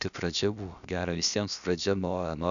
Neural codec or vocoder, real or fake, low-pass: codec, 16 kHz, about 1 kbps, DyCAST, with the encoder's durations; fake; 7.2 kHz